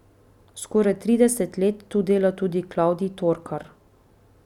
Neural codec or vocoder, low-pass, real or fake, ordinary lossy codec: none; 19.8 kHz; real; none